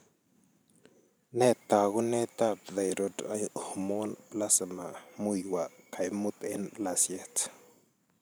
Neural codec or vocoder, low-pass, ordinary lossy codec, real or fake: vocoder, 44.1 kHz, 128 mel bands every 512 samples, BigVGAN v2; none; none; fake